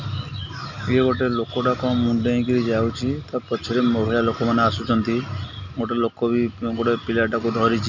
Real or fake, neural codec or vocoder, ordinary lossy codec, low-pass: real; none; none; 7.2 kHz